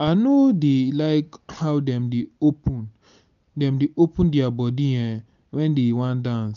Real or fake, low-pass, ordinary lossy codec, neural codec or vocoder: real; 7.2 kHz; none; none